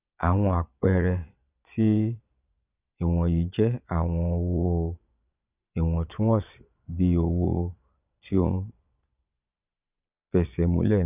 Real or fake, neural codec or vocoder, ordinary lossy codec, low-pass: fake; vocoder, 22.05 kHz, 80 mel bands, Vocos; none; 3.6 kHz